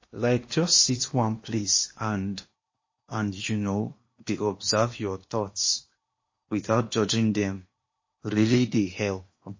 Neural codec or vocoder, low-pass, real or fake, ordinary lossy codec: codec, 16 kHz in and 24 kHz out, 0.8 kbps, FocalCodec, streaming, 65536 codes; 7.2 kHz; fake; MP3, 32 kbps